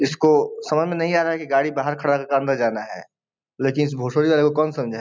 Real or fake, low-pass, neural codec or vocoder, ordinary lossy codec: real; none; none; none